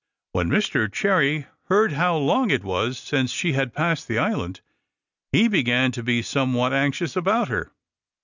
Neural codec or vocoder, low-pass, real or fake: none; 7.2 kHz; real